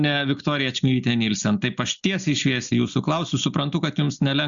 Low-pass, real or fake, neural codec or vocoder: 7.2 kHz; real; none